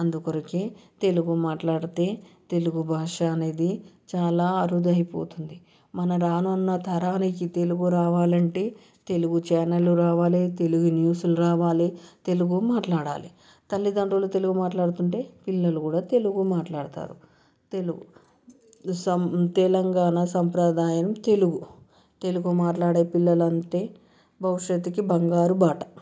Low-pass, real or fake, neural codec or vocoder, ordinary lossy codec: none; real; none; none